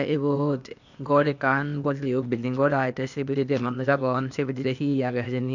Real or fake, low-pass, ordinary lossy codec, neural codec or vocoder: fake; 7.2 kHz; none; codec, 16 kHz, 0.8 kbps, ZipCodec